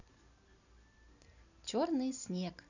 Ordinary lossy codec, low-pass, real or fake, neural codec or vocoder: none; 7.2 kHz; real; none